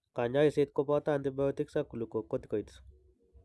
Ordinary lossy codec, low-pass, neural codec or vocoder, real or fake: none; none; none; real